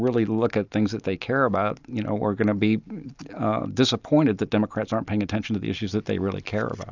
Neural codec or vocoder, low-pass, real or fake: none; 7.2 kHz; real